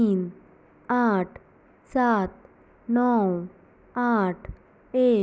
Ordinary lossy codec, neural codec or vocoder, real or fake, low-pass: none; none; real; none